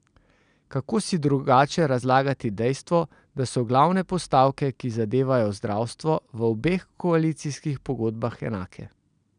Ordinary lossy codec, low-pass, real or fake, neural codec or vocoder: none; 9.9 kHz; real; none